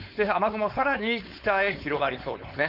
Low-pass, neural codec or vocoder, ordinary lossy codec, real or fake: 5.4 kHz; codec, 16 kHz, 4.8 kbps, FACodec; none; fake